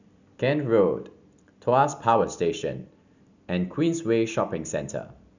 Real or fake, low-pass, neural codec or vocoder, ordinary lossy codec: real; 7.2 kHz; none; none